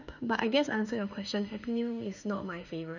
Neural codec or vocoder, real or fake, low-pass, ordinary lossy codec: autoencoder, 48 kHz, 32 numbers a frame, DAC-VAE, trained on Japanese speech; fake; 7.2 kHz; none